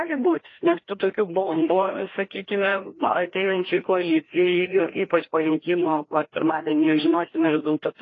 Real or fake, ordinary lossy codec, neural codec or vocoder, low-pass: fake; AAC, 32 kbps; codec, 16 kHz, 1 kbps, FreqCodec, larger model; 7.2 kHz